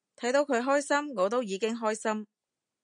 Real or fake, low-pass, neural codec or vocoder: real; 9.9 kHz; none